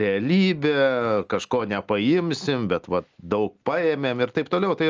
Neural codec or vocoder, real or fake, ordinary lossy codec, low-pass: none; real; Opus, 24 kbps; 7.2 kHz